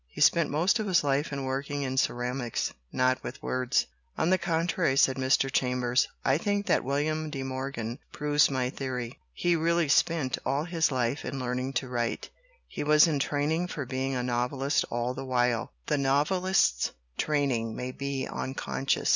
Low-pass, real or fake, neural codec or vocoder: 7.2 kHz; real; none